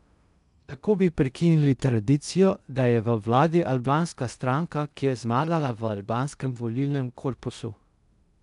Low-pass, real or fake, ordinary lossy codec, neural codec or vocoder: 10.8 kHz; fake; none; codec, 16 kHz in and 24 kHz out, 0.6 kbps, FocalCodec, streaming, 2048 codes